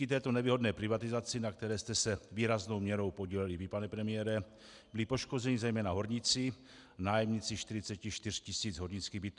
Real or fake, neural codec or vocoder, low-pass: real; none; 10.8 kHz